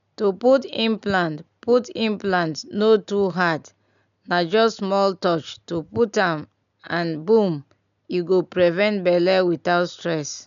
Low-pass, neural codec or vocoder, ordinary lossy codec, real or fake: 7.2 kHz; none; none; real